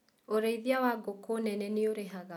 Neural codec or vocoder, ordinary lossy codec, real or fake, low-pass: none; none; real; 19.8 kHz